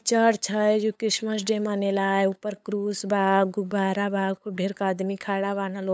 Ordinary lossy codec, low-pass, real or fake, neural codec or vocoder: none; none; fake; codec, 16 kHz, 8 kbps, FunCodec, trained on LibriTTS, 25 frames a second